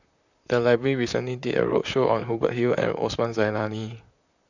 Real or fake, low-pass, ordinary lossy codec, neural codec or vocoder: fake; 7.2 kHz; none; vocoder, 44.1 kHz, 128 mel bands, Pupu-Vocoder